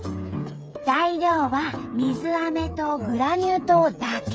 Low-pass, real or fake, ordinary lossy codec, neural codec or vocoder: none; fake; none; codec, 16 kHz, 8 kbps, FreqCodec, smaller model